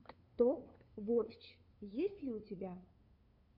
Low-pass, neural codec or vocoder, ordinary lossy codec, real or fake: 5.4 kHz; codec, 16 kHz, 2 kbps, FunCodec, trained on LibriTTS, 25 frames a second; AAC, 48 kbps; fake